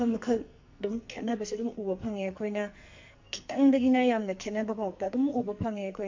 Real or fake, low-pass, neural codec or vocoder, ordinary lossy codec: fake; 7.2 kHz; codec, 44.1 kHz, 2.6 kbps, SNAC; MP3, 48 kbps